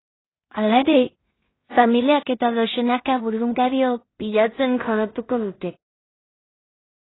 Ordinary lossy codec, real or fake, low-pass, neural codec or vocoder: AAC, 16 kbps; fake; 7.2 kHz; codec, 16 kHz in and 24 kHz out, 0.4 kbps, LongCat-Audio-Codec, two codebook decoder